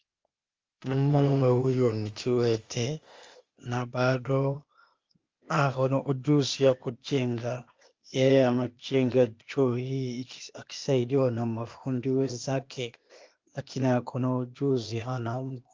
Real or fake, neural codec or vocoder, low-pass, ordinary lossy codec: fake; codec, 16 kHz, 0.8 kbps, ZipCodec; 7.2 kHz; Opus, 32 kbps